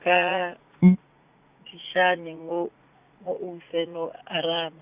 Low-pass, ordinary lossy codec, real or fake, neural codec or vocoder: 3.6 kHz; Opus, 64 kbps; fake; vocoder, 44.1 kHz, 80 mel bands, Vocos